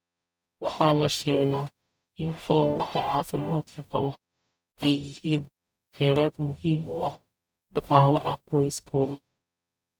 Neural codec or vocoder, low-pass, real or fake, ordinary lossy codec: codec, 44.1 kHz, 0.9 kbps, DAC; none; fake; none